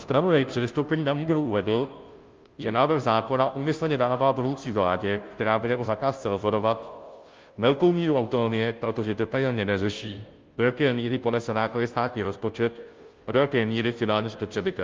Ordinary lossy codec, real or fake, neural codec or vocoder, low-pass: Opus, 24 kbps; fake; codec, 16 kHz, 0.5 kbps, FunCodec, trained on Chinese and English, 25 frames a second; 7.2 kHz